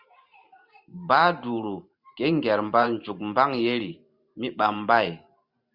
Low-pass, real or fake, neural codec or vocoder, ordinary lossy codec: 5.4 kHz; fake; vocoder, 44.1 kHz, 128 mel bands every 512 samples, BigVGAN v2; Opus, 64 kbps